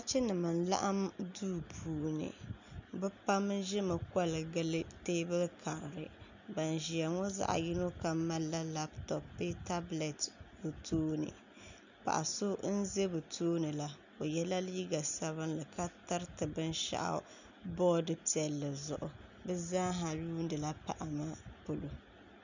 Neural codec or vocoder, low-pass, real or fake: none; 7.2 kHz; real